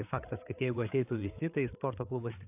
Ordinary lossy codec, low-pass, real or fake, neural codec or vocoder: AAC, 32 kbps; 3.6 kHz; fake; codec, 16 kHz, 8 kbps, FreqCodec, larger model